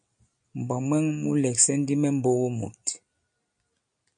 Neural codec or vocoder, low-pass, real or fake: none; 9.9 kHz; real